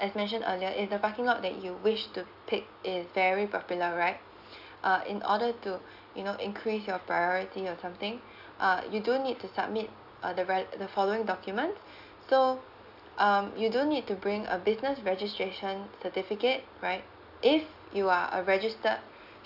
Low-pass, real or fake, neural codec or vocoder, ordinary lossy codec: 5.4 kHz; real; none; AAC, 48 kbps